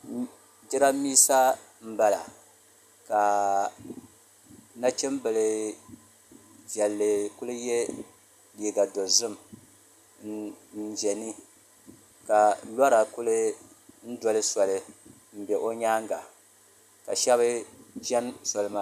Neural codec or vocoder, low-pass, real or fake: none; 14.4 kHz; real